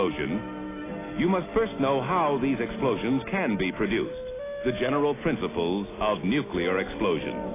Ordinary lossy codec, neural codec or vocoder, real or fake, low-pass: AAC, 16 kbps; none; real; 3.6 kHz